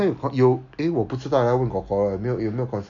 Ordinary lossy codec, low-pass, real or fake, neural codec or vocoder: none; 7.2 kHz; real; none